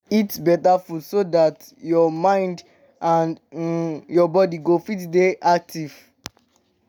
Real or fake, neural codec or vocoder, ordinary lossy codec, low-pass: real; none; none; none